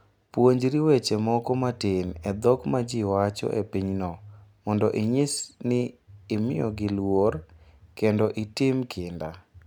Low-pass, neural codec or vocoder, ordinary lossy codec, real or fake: 19.8 kHz; none; none; real